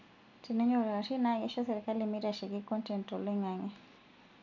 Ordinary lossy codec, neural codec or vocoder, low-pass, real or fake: none; none; 7.2 kHz; real